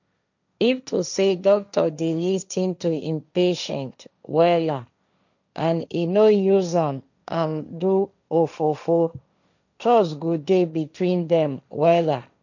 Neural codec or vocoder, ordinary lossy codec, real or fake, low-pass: codec, 16 kHz, 1.1 kbps, Voila-Tokenizer; none; fake; 7.2 kHz